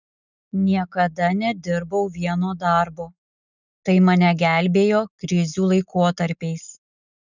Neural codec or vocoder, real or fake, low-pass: none; real; 7.2 kHz